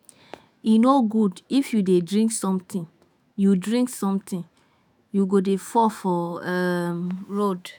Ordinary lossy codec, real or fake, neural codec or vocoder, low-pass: none; fake; autoencoder, 48 kHz, 128 numbers a frame, DAC-VAE, trained on Japanese speech; none